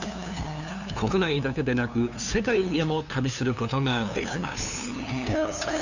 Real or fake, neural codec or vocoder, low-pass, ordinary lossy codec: fake; codec, 16 kHz, 2 kbps, FunCodec, trained on LibriTTS, 25 frames a second; 7.2 kHz; none